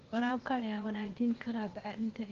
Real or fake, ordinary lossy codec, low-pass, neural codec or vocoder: fake; Opus, 16 kbps; 7.2 kHz; codec, 16 kHz, 0.8 kbps, ZipCodec